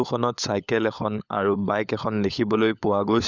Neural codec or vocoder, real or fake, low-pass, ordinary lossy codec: codec, 16 kHz, 16 kbps, FunCodec, trained on LibriTTS, 50 frames a second; fake; 7.2 kHz; none